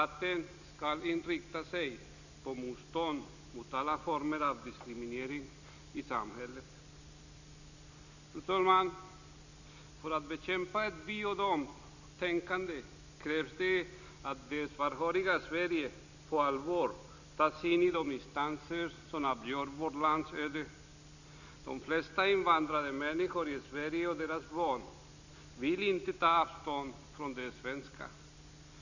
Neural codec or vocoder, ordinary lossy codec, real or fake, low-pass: none; none; real; 7.2 kHz